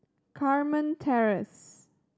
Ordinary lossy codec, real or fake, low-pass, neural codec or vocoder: none; real; none; none